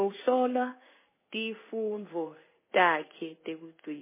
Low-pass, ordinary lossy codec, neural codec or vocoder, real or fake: 3.6 kHz; MP3, 16 kbps; codec, 16 kHz in and 24 kHz out, 1 kbps, XY-Tokenizer; fake